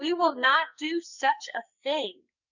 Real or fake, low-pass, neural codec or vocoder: fake; 7.2 kHz; codec, 44.1 kHz, 2.6 kbps, SNAC